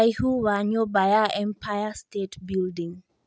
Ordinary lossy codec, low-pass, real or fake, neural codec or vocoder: none; none; real; none